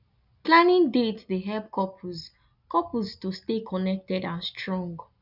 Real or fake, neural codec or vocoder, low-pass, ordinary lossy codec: real; none; 5.4 kHz; none